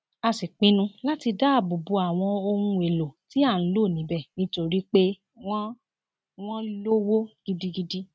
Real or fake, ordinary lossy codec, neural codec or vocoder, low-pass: real; none; none; none